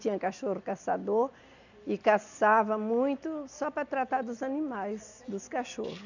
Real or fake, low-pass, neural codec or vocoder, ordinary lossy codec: real; 7.2 kHz; none; none